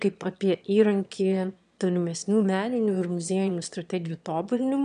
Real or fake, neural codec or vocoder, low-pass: fake; autoencoder, 22.05 kHz, a latent of 192 numbers a frame, VITS, trained on one speaker; 9.9 kHz